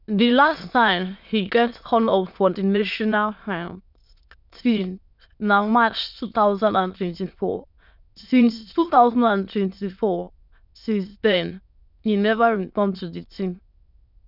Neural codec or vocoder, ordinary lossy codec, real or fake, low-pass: autoencoder, 22.05 kHz, a latent of 192 numbers a frame, VITS, trained on many speakers; none; fake; 5.4 kHz